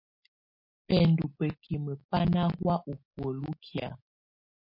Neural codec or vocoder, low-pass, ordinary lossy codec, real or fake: none; 5.4 kHz; MP3, 32 kbps; real